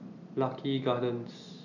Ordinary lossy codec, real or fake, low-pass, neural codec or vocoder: none; real; 7.2 kHz; none